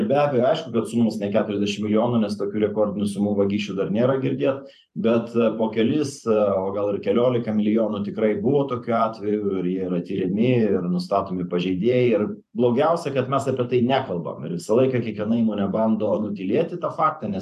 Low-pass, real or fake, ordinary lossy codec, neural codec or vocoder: 14.4 kHz; fake; AAC, 96 kbps; vocoder, 44.1 kHz, 128 mel bands every 512 samples, BigVGAN v2